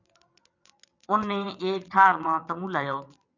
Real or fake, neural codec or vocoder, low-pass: fake; vocoder, 22.05 kHz, 80 mel bands, WaveNeXt; 7.2 kHz